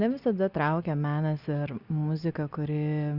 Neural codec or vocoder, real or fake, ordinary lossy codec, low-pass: none; real; Opus, 64 kbps; 5.4 kHz